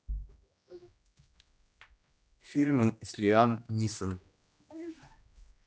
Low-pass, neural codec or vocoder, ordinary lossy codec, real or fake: none; codec, 16 kHz, 1 kbps, X-Codec, HuBERT features, trained on general audio; none; fake